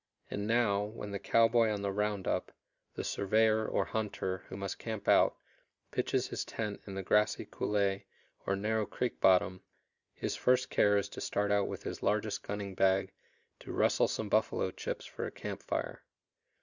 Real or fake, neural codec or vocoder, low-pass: real; none; 7.2 kHz